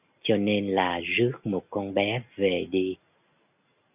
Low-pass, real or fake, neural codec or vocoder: 3.6 kHz; real; none